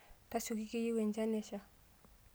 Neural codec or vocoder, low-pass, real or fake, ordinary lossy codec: none; none; real; none